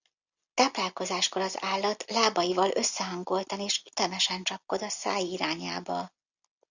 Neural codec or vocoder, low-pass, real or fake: none; 7.2 kHz; real